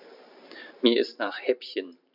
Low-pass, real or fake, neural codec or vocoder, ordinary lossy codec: 5.4 kHz; fake; vocoder, 44.1 kHz, 128 mel bands every 512 samples, BigVGAN v2; none